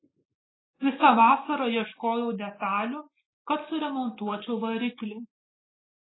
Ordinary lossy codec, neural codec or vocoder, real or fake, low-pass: AAC, 16 kbps; none; real; 7.2 kHz